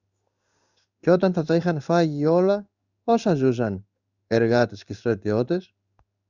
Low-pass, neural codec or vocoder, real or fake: 7.2 kHz; codec, 16 kHz in and 24 kHz out, 1 kbps, XY-Tokenizer; fake